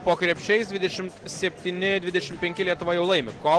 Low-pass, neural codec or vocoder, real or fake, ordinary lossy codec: 10.8 kHz; none; real; Opus, 16 kbps